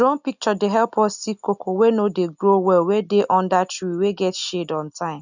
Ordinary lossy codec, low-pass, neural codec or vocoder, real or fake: none; 7.2 kHz; none; real